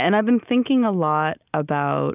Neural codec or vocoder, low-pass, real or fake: none; 3.6 kHz; real